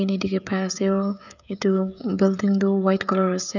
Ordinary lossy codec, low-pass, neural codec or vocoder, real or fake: none; 7.2 kHz; codec, 16 kHz, 16 kbps, FreqCodec, smaller model; fake